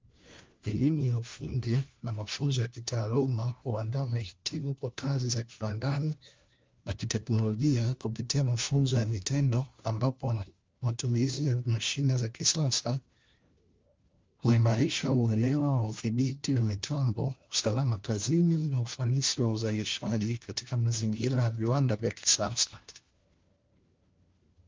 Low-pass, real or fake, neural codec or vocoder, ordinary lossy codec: 7.2 kHz; fake; codec, 16 kHz, 1 kbps, FunCodec, trained on LibriTTS, 50 frames a second; Opus, 32 kbps